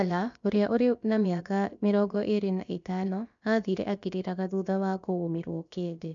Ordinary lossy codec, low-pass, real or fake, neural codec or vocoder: MP3, 64 kbps; 7.2 kHz; fake; codec, 16 kHz, about 1 kbps, DyCAST, with the encoder's durations